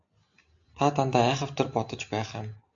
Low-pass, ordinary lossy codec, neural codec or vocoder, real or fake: 7.2 kHz; AAC, 64 kbps; none; real